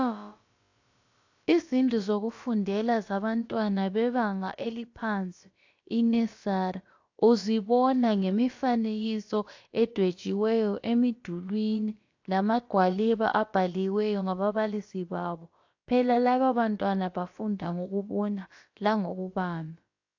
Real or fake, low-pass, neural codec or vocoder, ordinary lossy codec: fake; 7.2 kHz; codec, 16 kHz, about 1 kbps, DyCAST, with the encoder's durations; AAC, 48 kbps